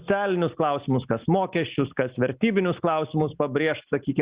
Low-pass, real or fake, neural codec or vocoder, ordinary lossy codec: 3.6 kHz; real; none; Opus, 64 kbps